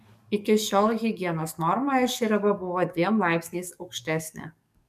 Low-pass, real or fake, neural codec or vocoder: 14.4 kHz; fake; codec, 44.1 kHz, 7.8 kbps, DAC